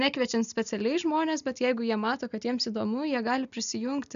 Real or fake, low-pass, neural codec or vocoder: real; 7.2 kHz; none